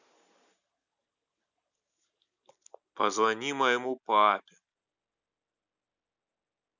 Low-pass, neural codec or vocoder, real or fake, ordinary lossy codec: 7.2 kHz; none; real; none